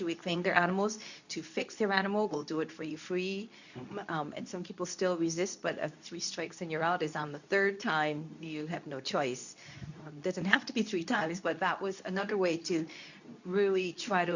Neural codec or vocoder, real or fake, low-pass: codec, 24 kHz, 0.9 kbps, WavTokenizer, medium speech release version 2; fake; 7.2 kHz